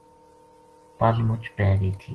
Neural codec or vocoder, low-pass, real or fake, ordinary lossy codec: none; 10.8 kHz; real; Opus, 16 kbps